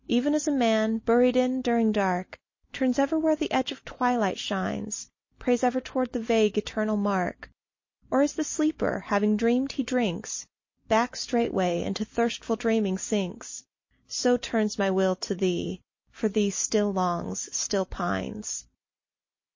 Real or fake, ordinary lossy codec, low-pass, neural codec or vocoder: real; MP3, 32 kbps; 7.2 kHz; none